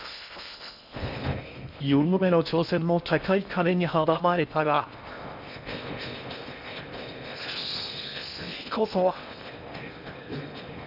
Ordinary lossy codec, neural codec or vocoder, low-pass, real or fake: none; codec, 16 kHz in and 24 kHz out, 0.6 kbps, FocalCodec, streaming, 4096 codes; 5.4 kHz; fake